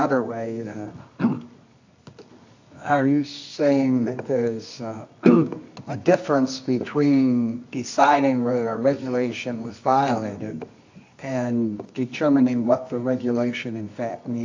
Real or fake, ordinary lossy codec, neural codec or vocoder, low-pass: fake; AAC, 48 kbps; codec, 24 kHz, 0.9 kbps, WavTokenizer, medium music audio release; 7.2 kHz